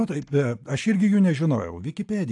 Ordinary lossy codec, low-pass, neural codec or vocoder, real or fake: AAC, 64 kbps; 10.8 kHz; none; real